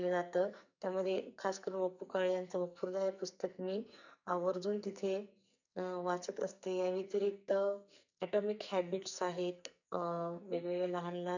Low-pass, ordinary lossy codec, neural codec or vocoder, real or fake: 7.2 kHz; none; codec, 44.1 kHz, 2.6 kbps, SNAC; fake